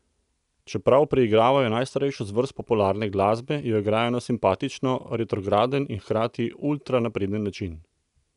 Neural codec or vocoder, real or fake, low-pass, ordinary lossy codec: none; real; 10.8 kHz; none